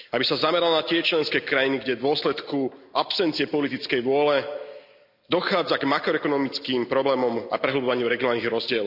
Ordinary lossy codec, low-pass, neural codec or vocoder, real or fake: AAC, 48 kbps; 5.4 kHz; none; real